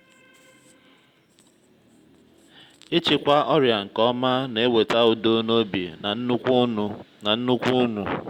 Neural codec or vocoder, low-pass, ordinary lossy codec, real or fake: none; 19.8 kHz; Opus, 64 kbps; real